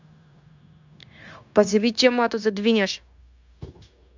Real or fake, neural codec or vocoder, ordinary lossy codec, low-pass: fake; codec, 16 kHz, 0.9 kbps, LongCat-Audio-Codec; MP3, 64 kbps; 7.2 kHz